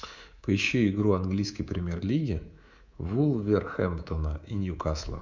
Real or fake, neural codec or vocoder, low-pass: fake; codec, 24 kHz, 3.1 kbps, DualCodec; 7.2 kHz